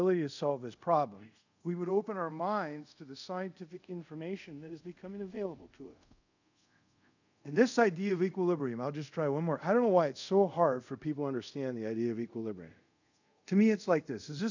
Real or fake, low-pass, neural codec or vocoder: fake; 7.2 kHz; codec, 24 kHz, 0.5 kbps, DualCodec